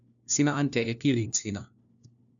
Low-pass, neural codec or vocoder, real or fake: 7.2 kHz; codec, 16 kHz, 1 kbps, FunCodec, trained on LibriTTS, 50 frames a second; fake